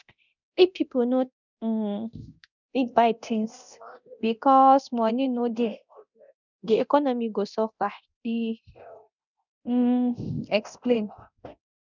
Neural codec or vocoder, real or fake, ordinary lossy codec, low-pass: codec, 24 kHz, 0.9 kbps, DualCodec; fake; none; 7.2 kHz